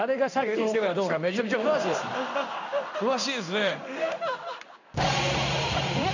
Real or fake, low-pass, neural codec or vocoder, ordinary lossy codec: fake; 7.2 kHz; codec, 16 kHz in and 24 kHz out, 1 kbps, XY-Tokenizer; none